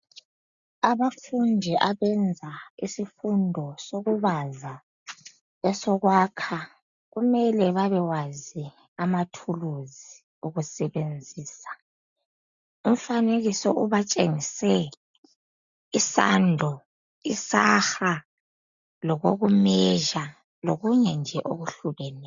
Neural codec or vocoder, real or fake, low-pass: none; real; 7.2 kHz